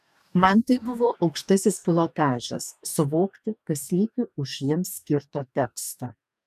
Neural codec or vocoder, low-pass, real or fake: codec, 44.1 kHz, 2.6 kbps, DAC; 14.4 kHz; fake